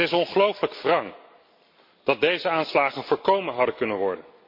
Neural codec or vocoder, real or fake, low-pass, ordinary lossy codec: none; real; 5.4 kHz; MP3, 32 kbps